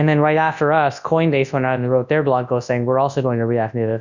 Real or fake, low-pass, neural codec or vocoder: fake; 7.2 kHz; codec, 24 kHz, 0.9 kbps, WavTokenizer, large speech release